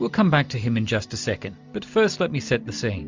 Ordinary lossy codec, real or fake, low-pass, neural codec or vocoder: MP3, 64 kbps; real; 7.2 kHz; none